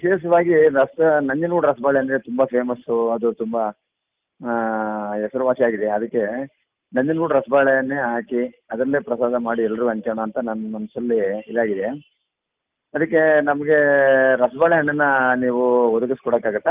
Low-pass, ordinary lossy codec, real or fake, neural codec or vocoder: 3.6 kHz; Opus, 32 kbps; real; none